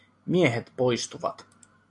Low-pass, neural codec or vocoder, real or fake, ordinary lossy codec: 10.8 kHz; none; real; AAC, 64 kbps